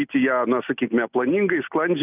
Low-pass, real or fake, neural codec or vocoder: 3.6 kHz; real; none